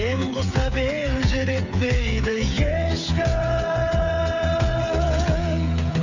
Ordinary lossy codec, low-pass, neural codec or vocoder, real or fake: none; 7.2 kHz; codec, 16 kHz, 8 kbps, FreqCodec, smaller model; fake